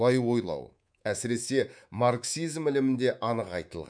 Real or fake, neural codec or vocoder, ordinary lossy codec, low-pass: fake; codec, 24 kHz, 3.1 kbps, DualCodec; none; 9.9 kHz